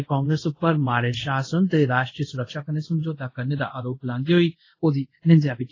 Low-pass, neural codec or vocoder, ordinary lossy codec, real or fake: 7.2 kHz; codec, 24 kHz, 0.5 kbps, DualCodec; AAC, 32 kbps; fake